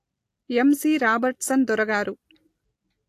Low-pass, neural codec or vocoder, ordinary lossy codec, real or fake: 14.4 kHz; none; AAC, 64 kbps; real